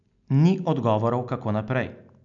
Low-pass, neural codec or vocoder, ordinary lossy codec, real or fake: 7.2 kHz; none; none; real